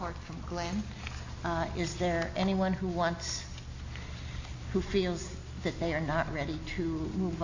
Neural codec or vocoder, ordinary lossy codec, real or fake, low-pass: none; AAC, 48 kbps; real; 7.2 kHz